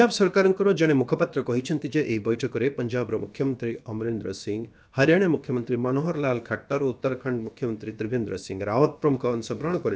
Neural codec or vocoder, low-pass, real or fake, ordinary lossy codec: codec, 16 kHz, about 1 kbps, DyCAST, with the encoder's durations; none; fake; none